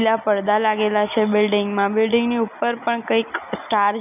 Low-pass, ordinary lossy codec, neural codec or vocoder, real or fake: 3.6 kHz; none; none; real